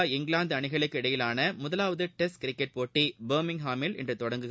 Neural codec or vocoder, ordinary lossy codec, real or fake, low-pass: none; none; real; none